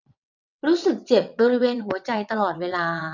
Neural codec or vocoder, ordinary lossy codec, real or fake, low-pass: vocoder, 22.05 kHz, 80 mel bands, WaveNeXt; none; fake; 7.2 kHz